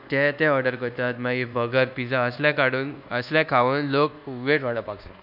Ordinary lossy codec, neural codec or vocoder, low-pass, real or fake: none; codec, 24 kHz, 1.2 kbps, DualCodec; 5.4 kHz; fake